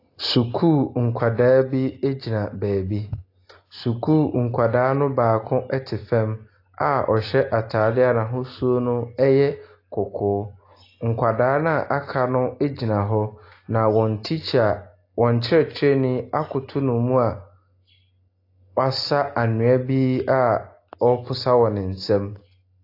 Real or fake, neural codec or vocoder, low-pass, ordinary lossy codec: real; none; 5.4 kHz; AAC, 32 kbps